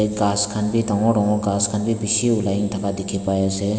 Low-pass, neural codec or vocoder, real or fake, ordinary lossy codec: none; none; real; none